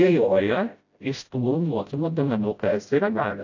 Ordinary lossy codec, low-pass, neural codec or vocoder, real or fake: AAC, 48 kbps; 7.2 kHz; codec, 16 kHz, 0.5 kbps, FreqCodec, smaller model; fake